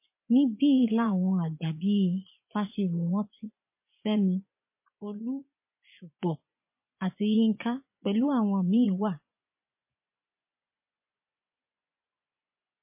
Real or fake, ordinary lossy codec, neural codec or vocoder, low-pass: fake; MP3, 24 kbps; vocoder, 24 kHz, 100 mel bands, Vocos; 3.6 kHz